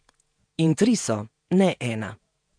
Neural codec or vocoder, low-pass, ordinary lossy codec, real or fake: vocoder, 48 kHz, 128 mel bands, Vocos; 9.9 kHz; MP3, 64 kbps; fake